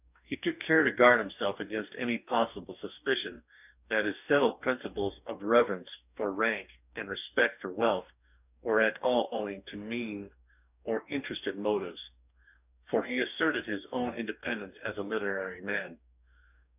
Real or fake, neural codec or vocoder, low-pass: fake; codec, 44.1 kHz, 2.6 kbps, DAC; 3.6 kHz